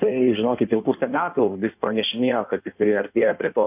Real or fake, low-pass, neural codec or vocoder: fake; 3.6 kHz; codec, 16 kHz in and 24 kHz out, 1.1 kbps, FireRedTTS-2 codec